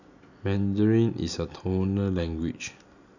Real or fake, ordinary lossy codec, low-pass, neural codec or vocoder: real; none; 7.2 kHz; none